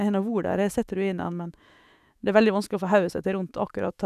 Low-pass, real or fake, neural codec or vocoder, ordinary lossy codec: 14.4 kHz; fake; autoencoder, 48 kHz, 128 numbers a frame, DAC-VAE, trained on Japanese speech; none